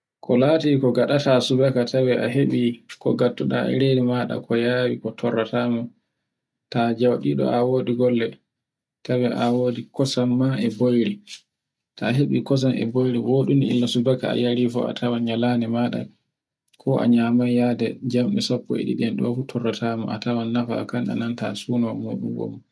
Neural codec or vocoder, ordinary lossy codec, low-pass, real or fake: none; none; none; real